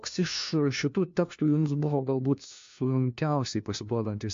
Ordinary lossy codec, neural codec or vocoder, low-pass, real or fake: MP3, 48 kbps; codec, 16 kHz, 1 kbps, FunCodec, trained on LibriTTS, 50 frames a second; 7.2 kHz; fake